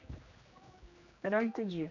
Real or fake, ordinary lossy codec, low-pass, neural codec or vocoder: fake; none; 7.2 kHz; codec, 16 kHz, 2 kbps, X-Codec, HuBERT features, trained on general audio